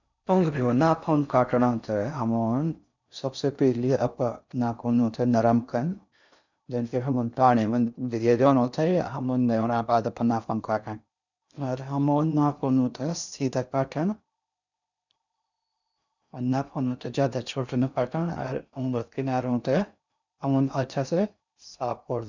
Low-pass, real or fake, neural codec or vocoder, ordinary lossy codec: 7.2 kHz; fake; codec, 16 kHz in and 24 kHz out, 0.6 kbps, FocalCodec, streaming, 4096 codes; none